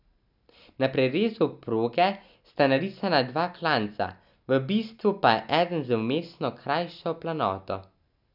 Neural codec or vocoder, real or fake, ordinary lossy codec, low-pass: none; real; none; 5.4 kHz